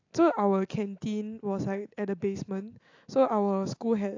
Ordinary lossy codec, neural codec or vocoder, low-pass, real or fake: AAC, 48 kbps; none; 7.2 kHz; real